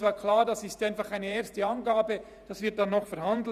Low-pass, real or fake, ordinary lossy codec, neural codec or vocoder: 14.4 kHz; fake; none; vocoder, 48 kHz, 128 mel bands, Vocos